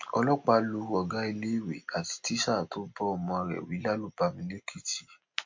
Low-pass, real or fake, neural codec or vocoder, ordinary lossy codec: 7.2 kHz; real; none; MP3, 48 kbps